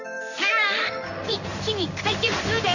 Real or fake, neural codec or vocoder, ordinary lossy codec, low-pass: fake; codec, 16 kHz in and 24 kHz out, 1 kbps, XY-Tokenizer; none; 7.2 kHz